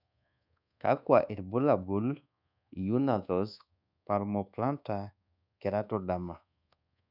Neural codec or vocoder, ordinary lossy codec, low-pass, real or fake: codec, 24 kHz, 1.2 kbps, DualCodec; none; 5.4 kHz; fake